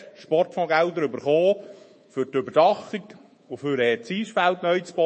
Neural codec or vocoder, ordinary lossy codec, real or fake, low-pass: codec, 24 kHz, 3.1 kbps, DualCodec; MP3, 32 kbps; fake; 10.8 kHz